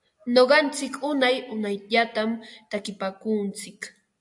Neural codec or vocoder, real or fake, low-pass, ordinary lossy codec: none; real; 10.8 kHz; AAC, 64 kbps